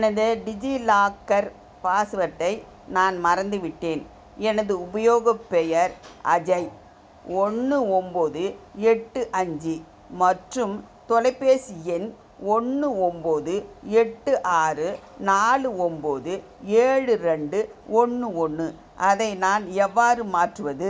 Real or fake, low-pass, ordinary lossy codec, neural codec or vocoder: real; none; none; none